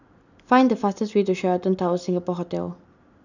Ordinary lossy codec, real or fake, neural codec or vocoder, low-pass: none; real; none; 7.2 kHz